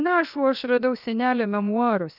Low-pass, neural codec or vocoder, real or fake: 5.4 kHz; codec, 16 kHz, 0.7 kbps, FocalCodec; fake